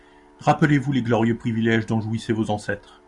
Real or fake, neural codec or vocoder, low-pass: real; none; 10.8 kHz